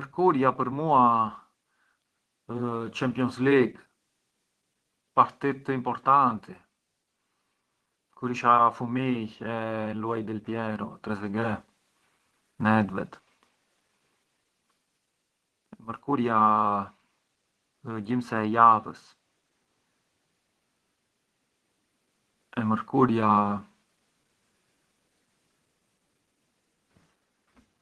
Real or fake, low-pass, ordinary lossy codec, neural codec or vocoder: fake; 10.8 kHz; Opus, 16 kbps; vocoder, 24 kHz, 100 mel bands, Vocos